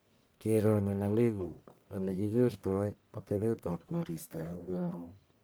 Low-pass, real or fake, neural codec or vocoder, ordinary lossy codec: none; fake; codec, 44.1 kHz, 1.7 kbps, Pupu-Codec; none